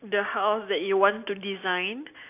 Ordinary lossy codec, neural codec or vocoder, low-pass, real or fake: AAC, 32 kbps; none; 3.6 kHz; real